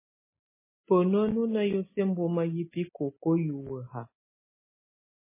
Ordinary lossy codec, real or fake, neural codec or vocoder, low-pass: MP3, 16 kbps; real; none; 3.6 kHz